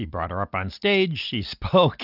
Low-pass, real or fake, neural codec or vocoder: 5.4 kHz; real; none